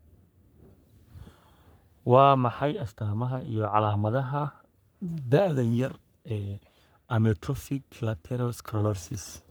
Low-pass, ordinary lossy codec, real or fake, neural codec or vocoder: none; none; fake; codec, 44.1 kHz, 3.4 kbps, Pupu-Codec